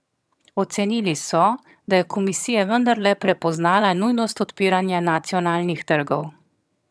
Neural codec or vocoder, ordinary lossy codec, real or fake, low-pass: vocoder, 22.05 kHz, 80 mel bands, HiFi-GAN; none; fake; none